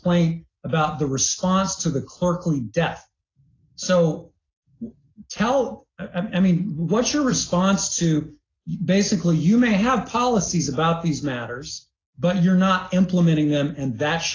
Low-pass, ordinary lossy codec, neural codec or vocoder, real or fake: 7.2 kHz; AAC, 32 kbps; none; real